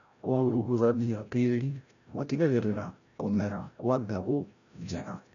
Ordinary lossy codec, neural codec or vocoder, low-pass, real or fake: none; codec, 16 kHz, 0.5 kbps, FreqCodec, larger model; 7.2 kHz; fake